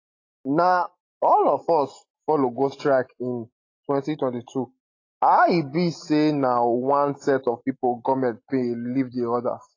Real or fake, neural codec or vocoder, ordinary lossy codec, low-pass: real; none; AAC, 32 kbps; 7.2 kHz